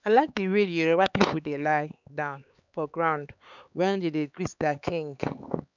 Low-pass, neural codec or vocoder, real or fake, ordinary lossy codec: 7.2 kHz; codec, 16 kHz, 4 kbps, X-Codec, HuBERT features, trained on LibriSpeech; fake; none